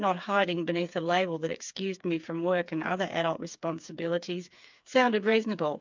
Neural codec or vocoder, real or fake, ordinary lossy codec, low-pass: codec, 16 kHz, 4 kbps, FreqCodec, smaller model; fake; MP3, 64 kbps; 7.2 kHz